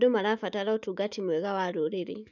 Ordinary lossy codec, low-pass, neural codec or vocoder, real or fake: none; 7.2 kHz; autoencoder, 48 kHz, 128 numbers a frame, DAC-VAE, trained on Japanese speech; fake